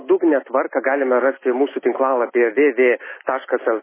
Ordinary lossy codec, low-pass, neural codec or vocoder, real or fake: MP3, 16 kbps; 3.6 kHz; none; real